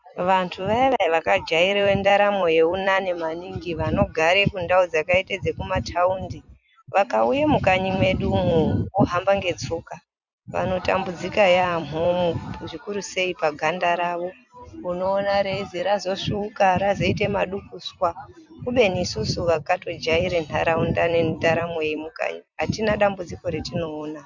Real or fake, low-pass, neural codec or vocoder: fake; 7.2 kHz; vocoder, 44.1 kHz, 128 mel bands every 256 samples, BigVGAN v2